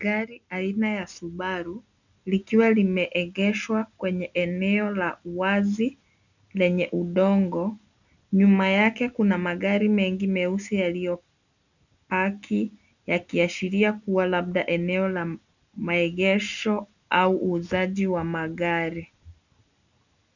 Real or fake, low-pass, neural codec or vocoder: real; 7.2 kHz; none